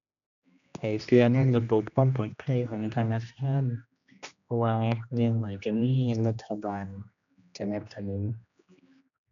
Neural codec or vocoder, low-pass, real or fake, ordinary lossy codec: codec, 16 kHz, 1 kbps, X-Codec, HuBERT features, trained on general audio; 7.2 kHz; fake; none